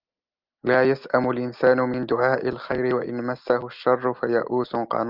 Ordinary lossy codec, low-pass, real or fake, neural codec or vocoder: Opus, 24 kbps; 5.4 kHz; real; none